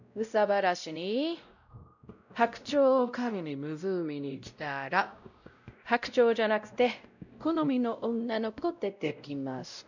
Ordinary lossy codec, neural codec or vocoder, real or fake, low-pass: none; codec, 16 kHz, 0.5 kbps, X-Codec, WavLM features, trained on Multilingual LibriSpeech; fake; 7.2 kHz